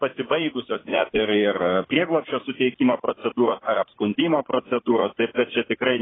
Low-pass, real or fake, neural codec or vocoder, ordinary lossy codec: 7.2 kHz; fake; vocoder, 44.1 kHz, 80 mel bands, Vocos; AAC, 16 kbps